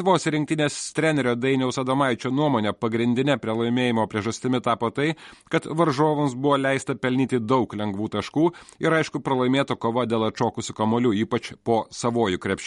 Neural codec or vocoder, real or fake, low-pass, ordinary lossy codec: none; real; 19.8 kHz; MP3, 48 kbps